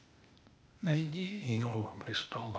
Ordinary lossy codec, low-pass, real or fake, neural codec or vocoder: none; none; fake; codec, 16 kHz, 0.8 kbps, ZipCodec